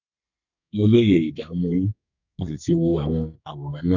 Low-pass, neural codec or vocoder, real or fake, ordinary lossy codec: 7.2 kHz; codec, 32 kHz, 1.9 kbps, SNAC; fake; none